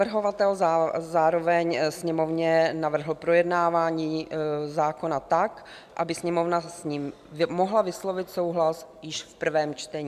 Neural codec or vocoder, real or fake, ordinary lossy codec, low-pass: none; real; MP3, 96 kbps; 14.4 kHz